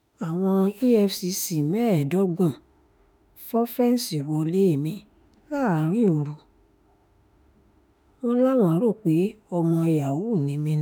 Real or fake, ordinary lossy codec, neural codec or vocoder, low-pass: fake; none; autoencoder, 48 kHz, 32 numbers a frame, DAC-VAE, trained on Japanese speech; none